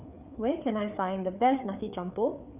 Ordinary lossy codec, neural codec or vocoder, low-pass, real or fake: none; codec, 16 kHz, 4 kbps, FreqCodec, larger model; 3.6 kHz; fake